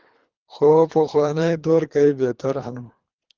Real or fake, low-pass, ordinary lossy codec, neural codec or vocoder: fake; 7.2 kHz; Opus, 32 kbps; codec, 24 kHz, 3 kbps, HILCodec